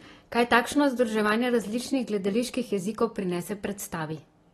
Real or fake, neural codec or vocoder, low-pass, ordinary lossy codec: real; none; 19.8 kHz; AAC, 32 kbps